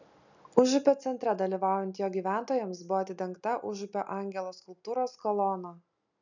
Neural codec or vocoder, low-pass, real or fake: none; 7.2 kHz; real